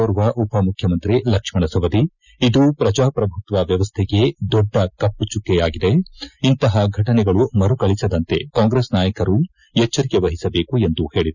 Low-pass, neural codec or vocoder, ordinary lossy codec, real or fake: 7.2 kHz; none; none; real